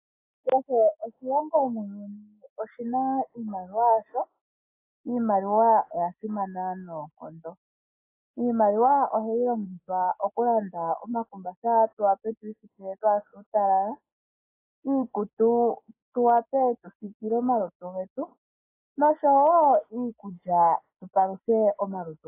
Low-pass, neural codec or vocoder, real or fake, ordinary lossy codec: 3.6 kHz; none; real; AAC, 24 kbps